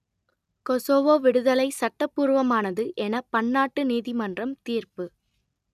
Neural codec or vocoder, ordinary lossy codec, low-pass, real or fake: none; none; 14.4 kHz; real